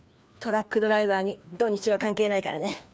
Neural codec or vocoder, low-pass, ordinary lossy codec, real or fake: codec, 16 kHz, 2 kbps, FreqCodec, larger model; none; none; fake